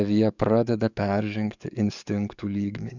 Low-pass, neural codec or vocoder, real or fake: 7.2 kHz; codec, 44.1 kHz, 7.8 kbps, DAC; fake